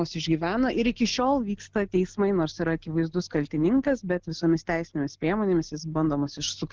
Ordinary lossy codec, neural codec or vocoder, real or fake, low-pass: Opus, 16 kbps; none; real; 7.2 kHz